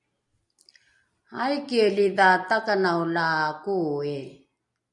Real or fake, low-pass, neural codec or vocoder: real; 10.8 kHz; none